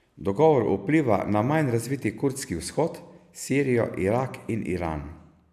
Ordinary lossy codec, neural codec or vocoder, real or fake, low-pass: AAC, 96 kbps; none; real; 14.4 kHz